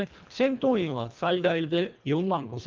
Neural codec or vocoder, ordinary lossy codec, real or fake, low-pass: codec, 24 kHz, 1.5 kbps, HILCodec; Opus, 32 kbps; fake; 7.2 kHz